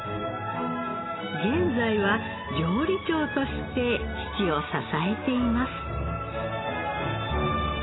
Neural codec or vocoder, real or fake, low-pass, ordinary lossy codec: none; real; 7.2 kHz; AAC, 16 kbps